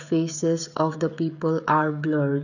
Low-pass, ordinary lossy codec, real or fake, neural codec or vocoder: 7.2 kHz; none; fake; codec, 16 kHz, 16 kbps, FreqCodec, smaller model